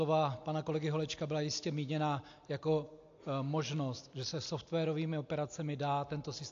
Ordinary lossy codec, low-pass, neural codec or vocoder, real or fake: AAC, 48 kbps; 7.2 kHz; none; real